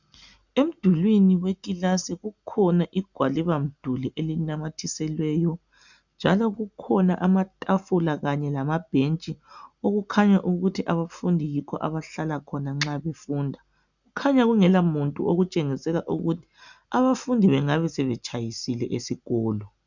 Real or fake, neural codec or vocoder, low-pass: real; none; 7.2 kHz